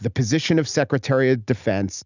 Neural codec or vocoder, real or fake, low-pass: none; real; 7.2 kHz